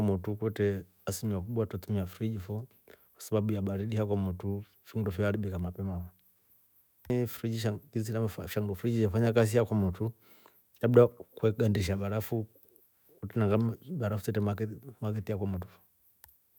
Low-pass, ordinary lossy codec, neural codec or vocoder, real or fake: none; none; autoencoder, 48 kHz, 128 numbers a frame, DAC-VAE, trained on Japanese speech; fake